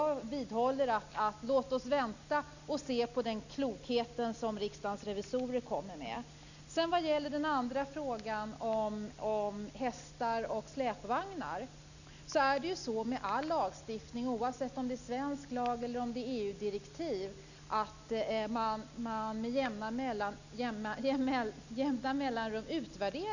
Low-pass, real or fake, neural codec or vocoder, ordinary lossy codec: 7.2 kHz; real; none; none